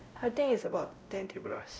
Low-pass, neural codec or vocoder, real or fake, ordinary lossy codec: none; codec, 16 kHz, 0.5 kbps, X-Codec, WavLM features, trained on Multilingual LibriSpeech; fake; none